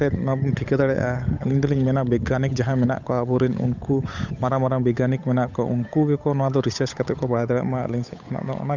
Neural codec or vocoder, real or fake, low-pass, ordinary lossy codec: codec, 16 kHz, 8 kbps, FunCodec, trained on Chinese and English, 25 frames a second; fake; 7.2 kHz; none